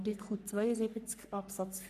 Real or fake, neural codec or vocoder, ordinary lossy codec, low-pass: fake; codec, 44.1 kHz, 3.4 kbps, Pupu-Codec; none; 14.4 kHz